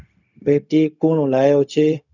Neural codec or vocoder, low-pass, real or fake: codec, 16 kHz, 0.4 kbps, LongCat-Audio-Codec; 7.2 kHz; fake